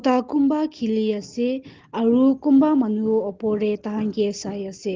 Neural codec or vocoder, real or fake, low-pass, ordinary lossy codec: none; real; 7.2 kHz; Opus, 16 kbps